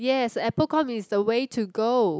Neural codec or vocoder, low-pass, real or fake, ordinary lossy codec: none; none; real; none